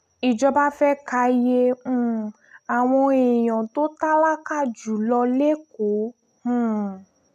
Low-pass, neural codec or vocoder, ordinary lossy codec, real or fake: 14.4 kHz; none; none; real